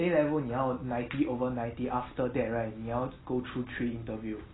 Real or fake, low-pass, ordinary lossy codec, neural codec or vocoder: real; 7.2 kHz; AAC, 16 kbps; none